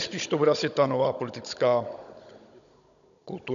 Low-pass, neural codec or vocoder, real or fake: 7.2 kHz; codec, 16 kHz, 16 kbps, FunCodec, trained on Chinese and English, 50 frames a second; fake